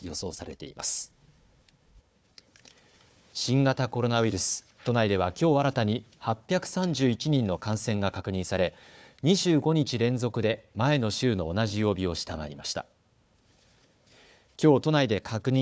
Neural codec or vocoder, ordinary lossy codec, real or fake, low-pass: codec, 16 kHz, 4 kbps, FunCodec, trained on Chinese and English, 50 frames a second; none; fake; none